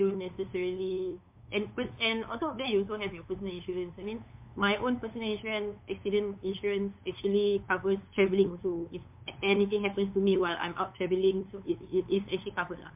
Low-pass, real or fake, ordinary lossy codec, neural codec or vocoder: 3.6 kHz; fake; MP3, 32 kbps; codec, 16 kHz, 8 kbps, FunCodec, trained on LibriTTS, 25 frames a second